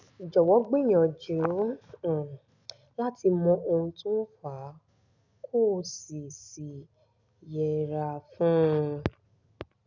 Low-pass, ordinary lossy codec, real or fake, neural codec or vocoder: 7.2 kHz; none; real; none